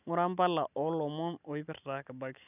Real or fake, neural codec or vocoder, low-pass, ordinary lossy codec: real; none; 3.6 kHz; none